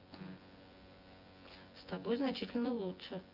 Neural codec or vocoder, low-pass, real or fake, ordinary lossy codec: vocoder, 24 kHz, 100 mel bands, Vocos; 5.4 kHz; fake; Opus, 32 kbps